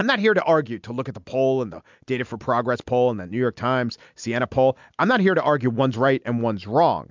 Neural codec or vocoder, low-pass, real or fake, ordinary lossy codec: none; 7.2 kHz; real; MP3, 64 kbps